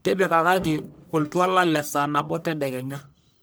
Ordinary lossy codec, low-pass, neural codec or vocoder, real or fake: none; none; codec, 44.1 kHz, 1.7 kbps, Pupu-Codec; fake